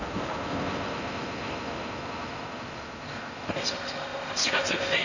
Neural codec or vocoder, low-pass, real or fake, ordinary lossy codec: codec, 16 kHz in and 24 kHz out, 0.6 kbps, FocalCodec, streaming, 4096 codes; 7.2 kHz; fake; none